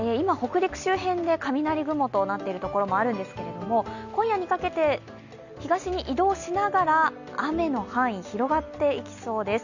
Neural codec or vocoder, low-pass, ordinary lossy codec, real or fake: none; 7.2 kHz; none; real